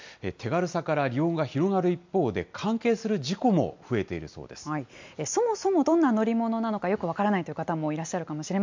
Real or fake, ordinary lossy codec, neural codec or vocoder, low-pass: real; MP3, 64 kbps; none; 7.2 kHz